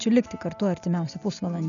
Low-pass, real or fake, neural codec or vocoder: 7.2 kHz; real; none